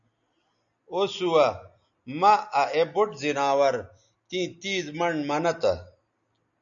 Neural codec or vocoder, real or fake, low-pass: none; real; 7.2 kHz